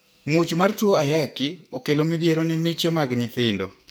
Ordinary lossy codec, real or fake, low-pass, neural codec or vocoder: none; fake; none; codec, 44.1 kHz, 2.6 kbps, SNAC